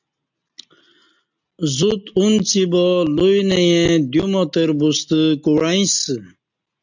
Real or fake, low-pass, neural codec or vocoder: real; 7.2 kHz; none